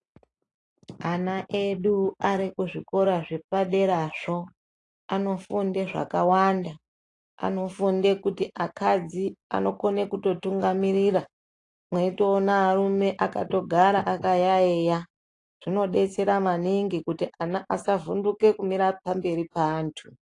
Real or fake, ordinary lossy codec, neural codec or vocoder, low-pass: real; AAC, 48 kbps; none; 10.8 kHz